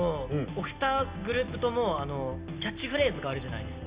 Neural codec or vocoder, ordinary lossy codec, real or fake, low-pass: none; Opus, 64 kbps; real; 3.6 kHz